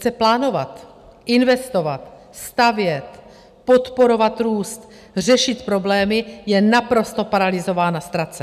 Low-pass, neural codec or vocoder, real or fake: 14.4 kHz; none; real